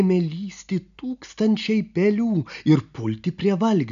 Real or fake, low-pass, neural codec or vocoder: real; 7.2 kHz; none